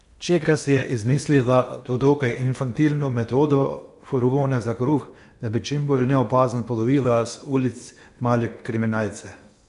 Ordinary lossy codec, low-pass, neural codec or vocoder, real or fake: AAC, 96 kbps; 10.8 kHz; codec, 16 kHz in and 24 kHz out, 0.8 kbps, FocalCodec, streaming, 65536 codes; fake